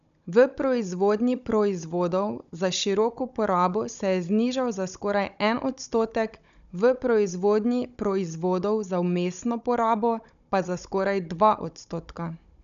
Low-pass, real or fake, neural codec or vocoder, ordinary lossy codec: 7.2 kHz; fake; codec, 16 kHz, 16 kbps, FunCodec, trained on Chinese and English, 50 frames a second; none